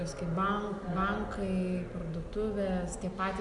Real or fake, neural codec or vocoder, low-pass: real; none; 10.8 kHz